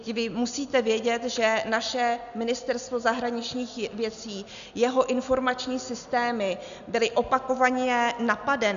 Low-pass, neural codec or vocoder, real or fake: 7.2 kHz; none; real